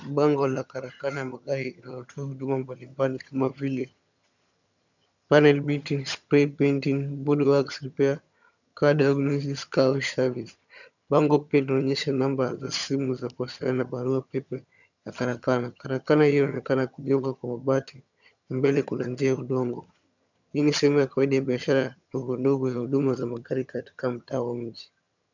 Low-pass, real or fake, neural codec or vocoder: 7.2 kHz; fake; vocoder, 22.05 kHz, 80 mel bands, HiFi-GAN